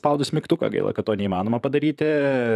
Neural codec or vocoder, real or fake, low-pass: vocoder, 44.1 kHz, 128 mel bands, Pupu-Vocoder; fake; 14.4 kHz